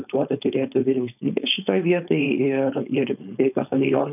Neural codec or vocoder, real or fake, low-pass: codec, 16 kHz, 4.8 kbps, FACodec; fake; 3.6 kHz